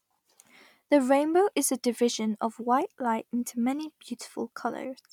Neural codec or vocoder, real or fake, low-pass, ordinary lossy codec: none; real; 19.8 kHz; none